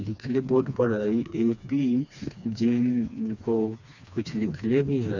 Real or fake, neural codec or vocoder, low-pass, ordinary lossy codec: fake; codec, 16 kHz, 2 kbps, FreqCodec, smaller model; 7.2 kHz; none